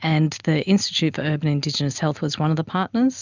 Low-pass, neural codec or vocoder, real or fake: 7.2 kHz; none; real